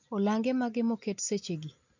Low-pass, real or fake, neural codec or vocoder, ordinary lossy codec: 7.2 kHz; real; none; MP3, 64 kbps